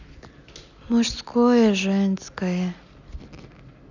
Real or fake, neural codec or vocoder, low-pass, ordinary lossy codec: real; none; 7.2 kHz; none